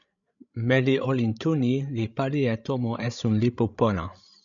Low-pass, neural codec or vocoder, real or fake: 7.2 kHz; codec, 16 kHz, 16 kbps, FreqCodec, larger model; fake